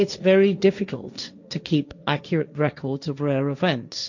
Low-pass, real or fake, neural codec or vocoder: 7.2 kHz; fake; codec, 16 kHz, 1.1 kbps, Voila-Tokenizer